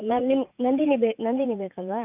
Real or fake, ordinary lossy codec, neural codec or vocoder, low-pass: fake; none; vocoder, 44.1 kHz, 80 mel bands, Vocos; 3.6 kHz